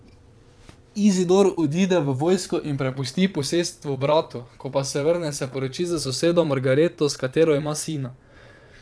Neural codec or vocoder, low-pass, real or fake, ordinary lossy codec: vocoder, 22.05 kHz, 80 mel bands, Vocos; none; fake; none